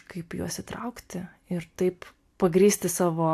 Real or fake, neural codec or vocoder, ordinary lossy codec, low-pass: real; none; AAC, 64 kbps; 14.4 kHz